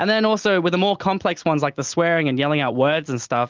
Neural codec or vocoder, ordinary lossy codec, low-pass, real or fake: none; Opus, 32 kbps; 7.2 kHz; real